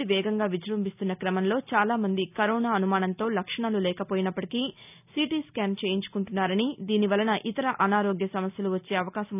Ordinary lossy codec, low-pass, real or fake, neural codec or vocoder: none; 3.6 kHz; real; none